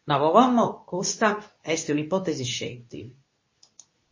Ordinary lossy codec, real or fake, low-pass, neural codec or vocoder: MP3, 32 kbps; fake; 7.2 kHz; codec, 24 kHz, 0.9 kbps, WavTokenizer, medium speech release version 2